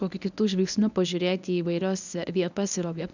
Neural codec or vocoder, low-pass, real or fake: codec, 24 kHz, 0.9 kbps, WavTokenizer, medium speech release version 1; 7.2 kHz; fake